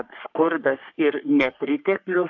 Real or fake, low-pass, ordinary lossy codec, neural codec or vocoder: fake; 7.2 kHz; AAC, 48 kbps; codec, 44.1 kHz, 3.4 kbps, Pupu-Codec